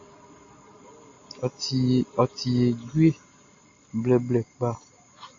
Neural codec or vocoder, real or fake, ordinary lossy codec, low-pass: none; real; AAC, 48 kbps; 7.2 kHz